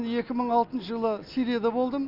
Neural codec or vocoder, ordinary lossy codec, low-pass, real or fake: none; none; 5.4 kHz; real